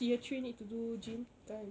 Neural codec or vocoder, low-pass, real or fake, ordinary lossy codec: none; none; real; none